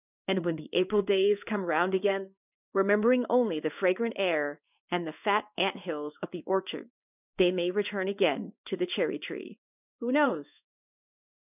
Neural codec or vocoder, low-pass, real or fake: codec, 16 kHz in and 24 kHz out, 1 kbps, XY-Tokenizer; 3.6 kHz; fake